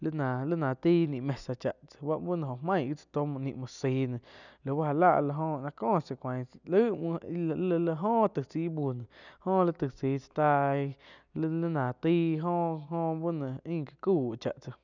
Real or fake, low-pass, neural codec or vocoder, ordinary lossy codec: real; 7.2 kHz; none; none